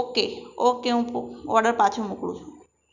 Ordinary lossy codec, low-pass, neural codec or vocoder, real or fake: none; 7.2 kHz; none; real